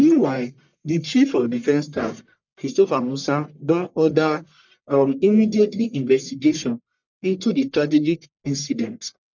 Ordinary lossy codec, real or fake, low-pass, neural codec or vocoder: none; fake; 7.2 kHz; codec, 44.1 kHz, 1.7 kbps, Pupu-Codec